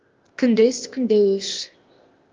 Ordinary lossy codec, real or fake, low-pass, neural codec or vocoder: Opus, 32 kbps; fake; 7.2 kHz; codec, 16 kHz, 0.8 kbps, ZipCodec